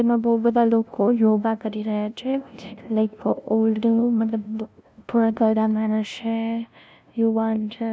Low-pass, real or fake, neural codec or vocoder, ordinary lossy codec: none; fake; codec, 16 kHz, 0.5 kbps, FunCodec, trained on LibriTTS, 25 frames a second; none